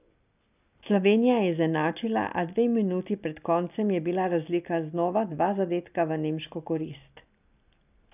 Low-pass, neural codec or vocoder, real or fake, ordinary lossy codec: 3.6 kHz; none; real; none